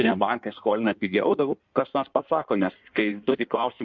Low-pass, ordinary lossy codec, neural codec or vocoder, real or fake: 7.2 kHz; MP3, 64 kbps; codec, 16 kHz in and 24 kHz out, 1.1 kbps, FireRedTTS-2 codec; fake